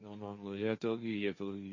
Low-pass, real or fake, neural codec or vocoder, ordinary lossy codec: 7.2 kHz; fake; codec, 16 kHz, 1.1 kbps, Voila-Tokenizer; MP3, 32 kbps